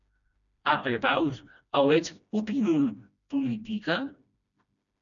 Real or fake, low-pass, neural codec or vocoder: fake; 7.2 kHz; codec, 16 kHz, 1 kbps, FreqCodec, smaller model